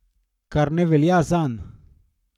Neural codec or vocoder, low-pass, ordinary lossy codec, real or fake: codec, 44.1 kHz, 7.8 kbps, Pupu-Codec; 19.8 kHz; none; fake